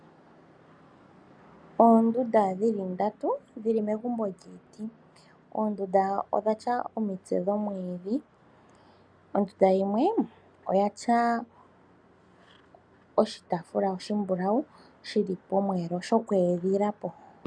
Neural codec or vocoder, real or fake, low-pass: none; real; 9.9 kHz